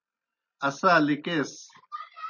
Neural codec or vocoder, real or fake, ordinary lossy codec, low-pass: none; real; MP3, 32 kbps; 7.2 kHz